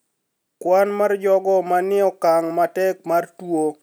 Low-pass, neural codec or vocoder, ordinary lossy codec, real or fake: none; none; none; real